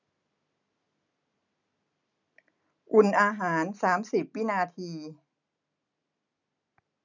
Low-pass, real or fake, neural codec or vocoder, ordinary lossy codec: 7.2 kHz; real; none; none